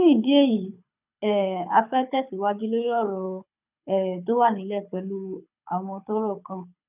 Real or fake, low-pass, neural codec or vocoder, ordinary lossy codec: fake; 3.6 kHz; codec, 24 kHz, 6 kbps, HILCodec; none